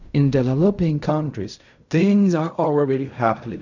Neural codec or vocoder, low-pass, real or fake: codec, 16 kHz in and 24 kHz out, 0.4 kbps, LongCat-Audio-Codec, fine tuned four codebook decoder; 7.2 kHz; fake